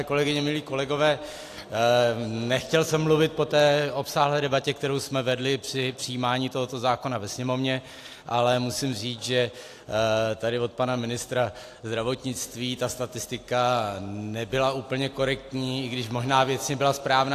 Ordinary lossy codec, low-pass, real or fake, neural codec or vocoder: AAC, 64 kbps; 14.4 kHz; real; none